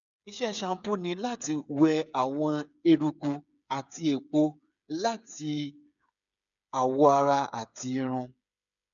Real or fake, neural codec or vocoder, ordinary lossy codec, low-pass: fake; codec, 16 kHz, 8 kbps, FreqCodec, smaller model; none; 7.2 kHz